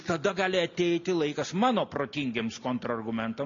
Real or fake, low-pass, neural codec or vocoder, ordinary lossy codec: real; 7.2 kHz; none; MP3, 64 kbps